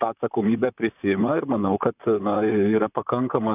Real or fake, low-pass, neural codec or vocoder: fake; 3.6 kHz; vocoder, 44.1 kHz, 128 mel bands, Pupu-Vocoder